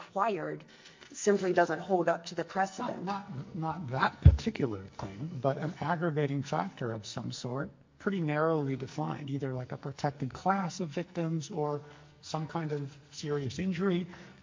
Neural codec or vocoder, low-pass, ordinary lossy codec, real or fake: codec, 44.1 kHz, 2.6 kbps, SNAC; 7.2 kHz; MP3, 48 kbps; fake